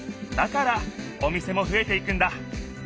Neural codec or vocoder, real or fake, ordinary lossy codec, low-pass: none; real; none; none